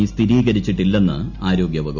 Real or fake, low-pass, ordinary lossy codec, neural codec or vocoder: real; 7.2 kHz; none; none